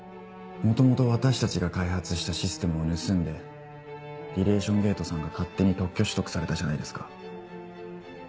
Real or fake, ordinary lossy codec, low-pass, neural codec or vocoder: real; none; none; none